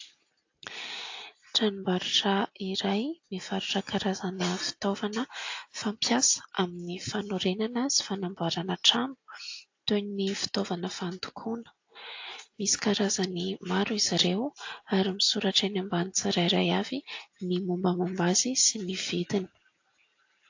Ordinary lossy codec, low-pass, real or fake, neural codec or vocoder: AAC, 48 kbps; 7.2 kHz; real; none